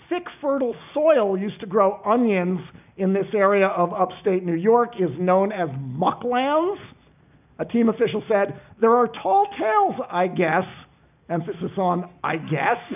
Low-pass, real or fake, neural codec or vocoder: 3.6 kHz; real; none